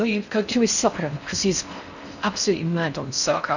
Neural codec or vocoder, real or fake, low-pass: codec, 16 kHz in and 24 kHz out, 0.6 kbps, FocalCodec, streaming, 4096 codes; fake; 7.2 kHz